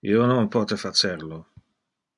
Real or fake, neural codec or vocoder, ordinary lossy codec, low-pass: real; none; MP3, 96 kbps; 10.8 kHz